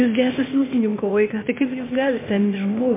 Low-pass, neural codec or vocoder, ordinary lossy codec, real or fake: 3.6 kHz; codec, 16 kHz, 0.8 kbps, ZipCodec; MP3, 24 kbps; fake